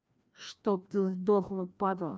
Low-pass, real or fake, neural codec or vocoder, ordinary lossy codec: none; fake; codec, 16 kHz, 1 kbps, FreqCodec, larger model; none